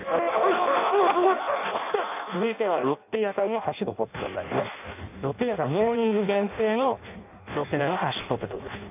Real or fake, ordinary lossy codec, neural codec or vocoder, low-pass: fake; none; codec, 16 kHz in and 24 kHz out, 0.6 kbps, FireRedTTS-2 codec; 3.6 kHz